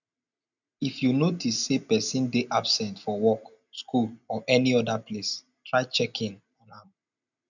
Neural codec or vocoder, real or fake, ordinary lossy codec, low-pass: none; real; none; 7.2 kHz